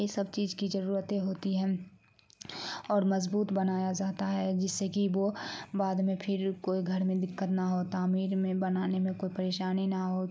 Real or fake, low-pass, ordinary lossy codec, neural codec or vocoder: real; none; none; none